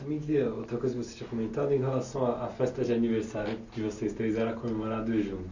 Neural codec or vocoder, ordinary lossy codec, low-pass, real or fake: none; Opus, 64 kbps; 7.2 kHz; real